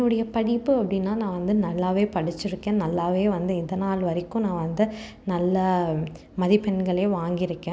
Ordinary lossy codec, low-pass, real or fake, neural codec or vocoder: none; none; real; none